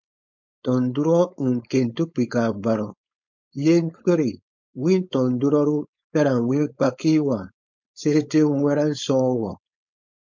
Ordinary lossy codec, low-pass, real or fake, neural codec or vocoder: MP3, 48 kbps; 7.2 kHz; fake; codec, 16 kHz, 4.8 kbps, FACodec